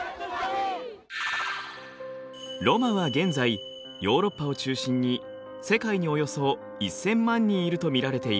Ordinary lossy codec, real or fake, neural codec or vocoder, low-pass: none; real; none; none